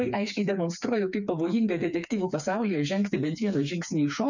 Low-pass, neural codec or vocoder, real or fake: 7.2 kHz; codec, 16 kHz, 4 kbps, FreqCodec, smaller model; fake